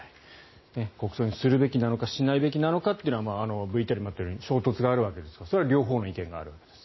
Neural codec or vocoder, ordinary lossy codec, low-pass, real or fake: none; MP3, 24 kbps; 7.2 kHz; real